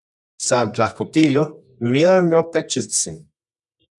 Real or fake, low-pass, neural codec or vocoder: fake; 10.8 kHz; codec, 24 kHz, 0.9 kbps, WavTokenizer, medium music audio release